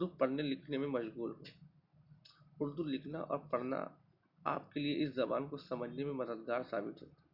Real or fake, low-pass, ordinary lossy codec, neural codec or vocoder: real; 5.4 kHz; none; none